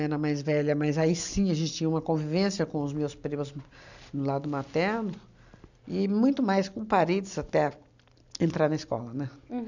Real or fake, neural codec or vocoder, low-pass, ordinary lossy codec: real; none; 7.2 kHz; none